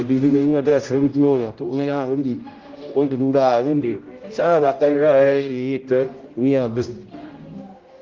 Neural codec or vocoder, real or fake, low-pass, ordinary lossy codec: codec, 16 kHz, 0.5 kbps, X-Codec, HuBERT features, trained on general audio; fake; 7.2 kHz; Opus, 32 kbps